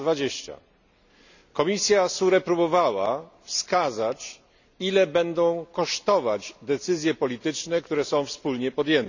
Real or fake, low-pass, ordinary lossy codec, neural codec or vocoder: real; 7.2 kHz; none; none